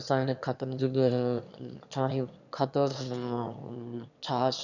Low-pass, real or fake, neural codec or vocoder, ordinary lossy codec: 7.2 kHz; fake; autoencoder, 22.05 kHz, a latent of 192 numbers a frame, VITS, trained on one speaker; none